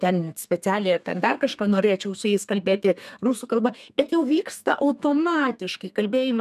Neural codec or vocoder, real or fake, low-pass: codec, 32 kHz, 1.9 kbps, SNAC; fake; 14.4 kHz